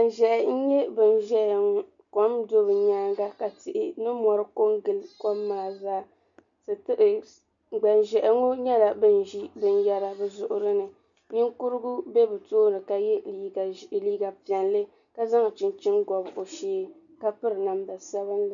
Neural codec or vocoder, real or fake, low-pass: none; real; 7.2 kHz